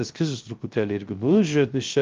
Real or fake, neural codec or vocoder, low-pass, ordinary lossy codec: fake; codec, 16 kHz, 0.3 kbps, FocalCodec; 7.2 kHz; Opus, 32 kbps